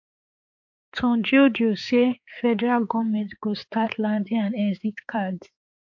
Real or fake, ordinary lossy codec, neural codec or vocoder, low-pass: fake; MP3, 48 kbps; codec, 16 kHz, 4 kbps, X-Codec, HuBERT features, trained on balanced general audio; 7.2 kHz